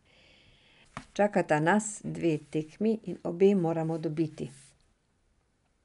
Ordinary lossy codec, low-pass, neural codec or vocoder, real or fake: none; 10.8 kHz; none; real